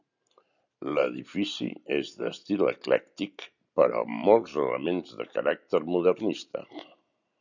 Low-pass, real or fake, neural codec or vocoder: 7.2 kHz; real; none